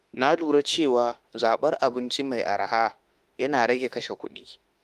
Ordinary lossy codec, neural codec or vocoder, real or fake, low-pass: Opus, 24 kbps; autoencoder, 48 kHz, 32 numbers a frame, DAC-VAE, trained on Japanese speech; fake; 14.4 kHz